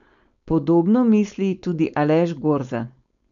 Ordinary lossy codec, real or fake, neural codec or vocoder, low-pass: none; fake; codec, 16 kHz, 4.8 kbps, FACodec; 7.2 kHz